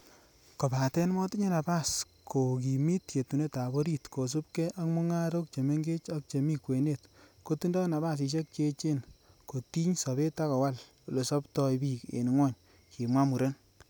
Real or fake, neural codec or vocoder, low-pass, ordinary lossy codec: real; none; none; none